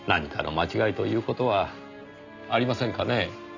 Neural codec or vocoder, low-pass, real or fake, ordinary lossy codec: none; 7.2 kHz; real; none